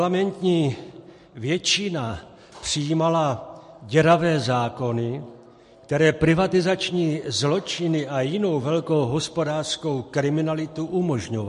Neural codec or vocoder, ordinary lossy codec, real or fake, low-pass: none; MP3, 48 kbps; real; 14.4 kHz